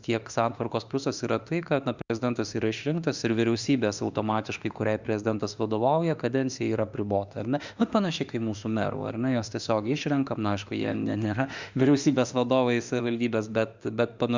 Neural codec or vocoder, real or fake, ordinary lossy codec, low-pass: autoencoder, 48 kHz, 32 numbers a frame, DAC-VAE, trained on Japanese speech; fake; Opus, 64 kbps; 7.2 kHz